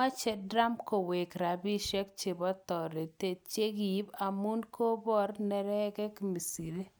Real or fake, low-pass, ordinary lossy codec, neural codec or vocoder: real; none; none; none